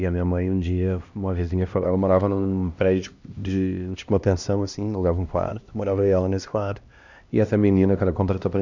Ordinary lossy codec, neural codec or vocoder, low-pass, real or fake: none; codec, 16 kHz, 1 kbps, X-Codec, HuBERT features, trained on LibriSpeech; 7.2 kHz; fake